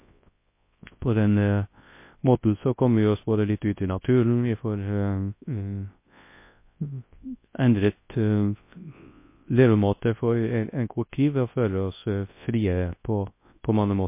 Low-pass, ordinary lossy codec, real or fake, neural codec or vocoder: 3.6 kHz; MP3, 24 kbps; fake; codec, 24 kHz, 0.9 kbps, WavTokenizer, large speech release